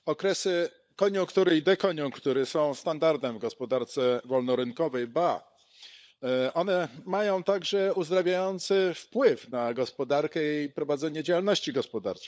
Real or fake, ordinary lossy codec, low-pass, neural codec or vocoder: fake; none; none; codec, 16 kHz, 16 kbps, FunCodec, trained on LibriTTS, 50 frames a second